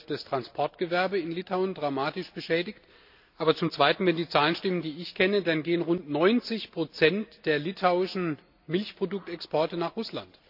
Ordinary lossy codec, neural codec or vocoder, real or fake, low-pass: none; none; real; 5.4 kHz